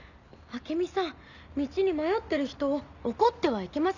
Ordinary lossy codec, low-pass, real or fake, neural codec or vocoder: none; 7.2 kHz; real; none